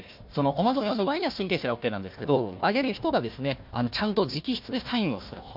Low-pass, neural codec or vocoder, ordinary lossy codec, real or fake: 5.4 kHz; codec, 16 kHz, 1 kbps, FunCodec, trained on Chinese and English, 50 frames a second; MP3, 48 kbps; fake